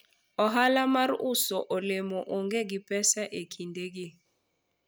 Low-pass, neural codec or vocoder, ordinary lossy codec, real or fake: none; none; none; real